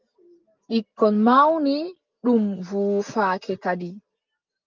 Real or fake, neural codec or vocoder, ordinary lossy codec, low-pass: real; none; Opus, 24 kbps; 7.2 kHz